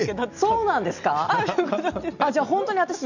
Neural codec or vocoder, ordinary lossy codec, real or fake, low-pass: none; none; real; 7.2 kHz